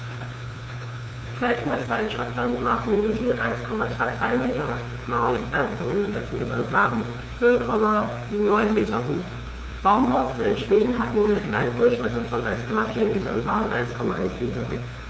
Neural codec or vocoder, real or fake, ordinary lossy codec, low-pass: codec, 16 kHz, 2 kbps, FunCodec, trained on LibriTTS, 25 frames a second; fake; none; none